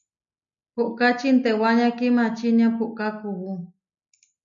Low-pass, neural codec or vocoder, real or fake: 7.2 kHz; none; real